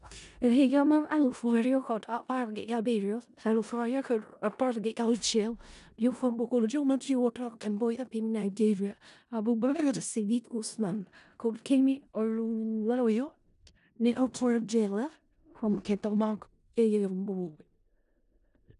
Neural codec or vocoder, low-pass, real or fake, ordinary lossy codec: codec, 16 kHz in and 24 kHz out, 0.4 kbps, LongCat-Audio-Codec, four codebook decoder; 10.8 kHz; fake; none